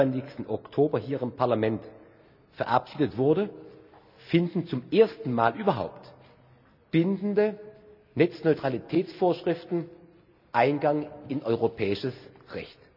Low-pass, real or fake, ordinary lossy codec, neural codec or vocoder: 5.4 kHz; real; none; none